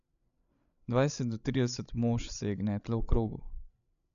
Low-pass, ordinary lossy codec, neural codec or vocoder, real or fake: 7.2 kHz; none; codec, 16 kHz, 16 kbps, FreqCodec, larger model; fake